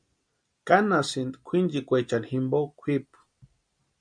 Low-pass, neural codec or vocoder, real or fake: 9.9 kHz; none; real